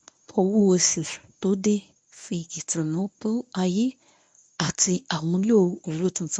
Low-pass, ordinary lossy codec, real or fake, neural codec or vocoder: 9.9 kHz; none; fake; codec, 24 kHz, 0.9 kbps, WavTokenizer, medium speech release version 1